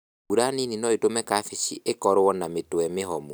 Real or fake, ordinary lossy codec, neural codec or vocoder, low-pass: real; none; none; none